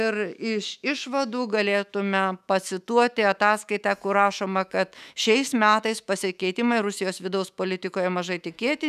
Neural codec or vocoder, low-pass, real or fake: autoencoder, 48 kHz, 128 numbers a frame, DAC-VAE, trained on Japanese speech; 14.4 kHz; fake